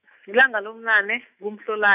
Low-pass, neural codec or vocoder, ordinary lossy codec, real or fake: 3.6 kHz; autoencoder, 48 kHz, 128 numbers a frame, DAC-VAE, trained on Japanese speech; none; fake